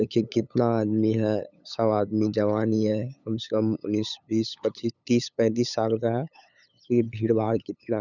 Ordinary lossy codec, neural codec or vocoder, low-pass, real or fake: none; codec, 16 kHz, 8 kbps, FunCodec, trained on LibriTTS, 25 frames a second; 7.2 kHz; fake